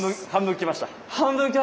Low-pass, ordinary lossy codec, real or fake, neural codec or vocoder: none; none; real; none